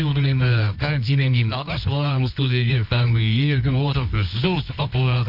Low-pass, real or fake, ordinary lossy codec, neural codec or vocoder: 5.4 kHz; fake; none; codec, 24 kHz, 0.9 kbps, WavTokenizer, medium music audio release